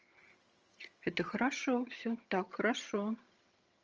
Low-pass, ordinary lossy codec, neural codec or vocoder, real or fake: 7.2 kHz; Opus, 24 kbps; vocoder, 22.05 kHz, 80 mel bands, HiFi-GAN; fake